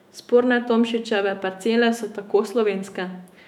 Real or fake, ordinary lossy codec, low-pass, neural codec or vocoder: fake; none; 19.8 kHz; autoencoder, 48 kHz, 128 numbers a frame, DAC-VAE, trained on Japanese speech